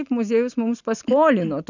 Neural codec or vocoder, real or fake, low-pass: none; real; 7.2 kHz